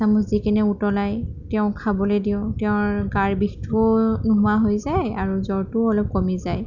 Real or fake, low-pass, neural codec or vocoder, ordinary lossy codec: real; 7.2 kHz; none; none